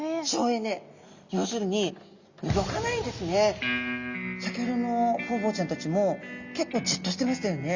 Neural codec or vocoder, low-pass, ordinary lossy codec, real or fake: none; 7.2 kHz; Opus, 64 kbps; real